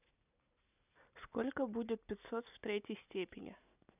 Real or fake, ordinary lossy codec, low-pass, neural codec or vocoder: real; none; 3.6 kHz; none